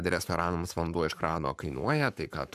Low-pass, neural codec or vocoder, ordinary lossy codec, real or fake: 14.4 kHz; codec, 44.1 kHz, 7.8 kbps, Pupu-Codec; AAC, 96 kbps; fake